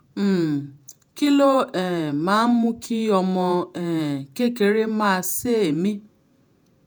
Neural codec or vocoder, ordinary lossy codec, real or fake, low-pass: vocoder, 48 kHz, 128 mel bands, Vocos; none; fake; none